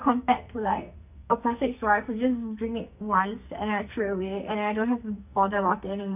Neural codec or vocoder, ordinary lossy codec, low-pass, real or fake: codec, 44.1 kHz, 2.6 kbps, SNAC; none; 3.6 kHz; fake